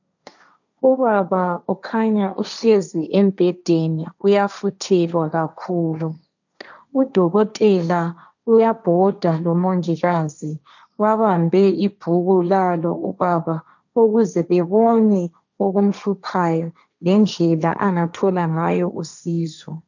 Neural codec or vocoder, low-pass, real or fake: codec, 16 kHz, 1.1 kbps, Voila-Tokenizer; 7.2 kHz; fake